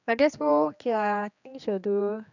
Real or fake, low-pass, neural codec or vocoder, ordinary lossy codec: fake; 7.2 kHz; codec, 16 kHz, 2 kbps, X-Codec, HuBERT features, trained on general audio; none